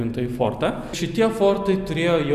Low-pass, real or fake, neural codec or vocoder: 14.4 kHz; real; none